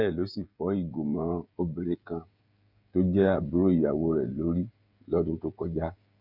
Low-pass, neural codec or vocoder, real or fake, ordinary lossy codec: 5.4 kHz; codec, 16 kHz, 16 kbps, FreqCodec, smaller model; fake; none